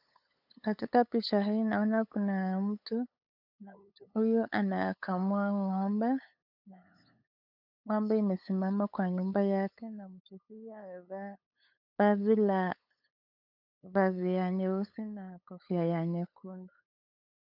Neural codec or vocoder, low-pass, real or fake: codec, 16 kHz, 8 kbps, FunCodec, trained on LibriTTS, 25 frames a second; 5.4 kHz; fake